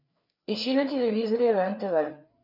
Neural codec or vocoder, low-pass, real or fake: codec, 16 kHz, 4 kbps, FreqCodec, larger model; 5.4 kHz; fake